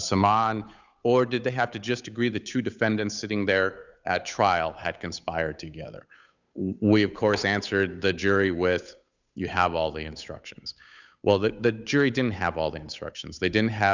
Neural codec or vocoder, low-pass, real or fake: codec, 16 kHz, 8 kbps, FunCodec, trained on Chinese and English, 25 frames a second; 7.2 kHz; fake